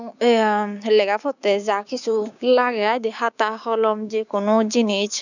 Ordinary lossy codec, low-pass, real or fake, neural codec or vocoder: none; 7.2 kHz; fake; codec, 16 kHz, 6 kbps, DAC